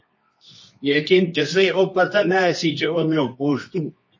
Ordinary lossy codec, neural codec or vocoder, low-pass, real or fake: MP3, 32 kbps; codec, 24 kHz, 0.9 kbps, WavTokenizer, medium music audio release; 7.2 kHz; fake